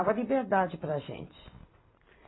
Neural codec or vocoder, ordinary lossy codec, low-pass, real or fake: vocoder, 44.1 kHz, 128 mel bands every 256 samples, BigVGAN v2; AAC, 16 kbps; 7.2 kHz; fake